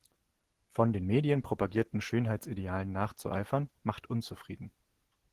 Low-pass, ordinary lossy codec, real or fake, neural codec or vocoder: 14.4 kHz; Opus, 16 kbps; real; none